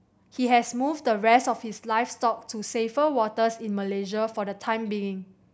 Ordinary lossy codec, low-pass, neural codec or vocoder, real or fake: none; none; none; real